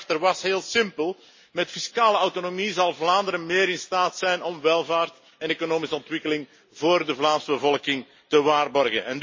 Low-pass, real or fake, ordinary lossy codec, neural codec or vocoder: 7.2 kHz; real; MP3, 32 kbps; none